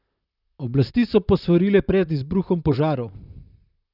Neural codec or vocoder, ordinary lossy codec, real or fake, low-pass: vocoder, 44.1 kHz, 128 mel bands, Pupu-Vocoder; Opus, 64 kbps; fake; 5.4 kHz